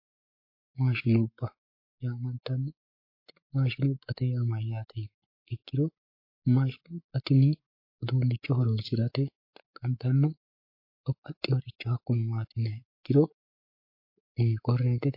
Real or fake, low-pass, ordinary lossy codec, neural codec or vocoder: fake; 5.4 kHz; MP3, 32 kbps; codec, 44.1 kHz, 7.8 kbps, Pupu-Codec